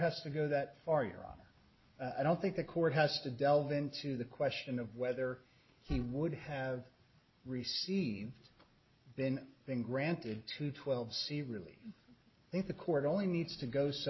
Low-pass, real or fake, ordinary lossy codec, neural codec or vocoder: 7.2 kHz; real; MP3, 24 kbps; none